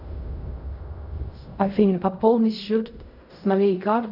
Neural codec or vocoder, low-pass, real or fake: codec, 16 kHz in and 24 kHz out, 0.4 kbps, LongCat-Audio-Codec, fine tuned four codebook decoder; 5.4 kHz; fake